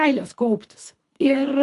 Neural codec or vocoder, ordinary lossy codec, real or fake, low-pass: codec, 24 kHz, 3 kbps, HILCodec; AAC, 48 kbps; fake; 10.8 kHz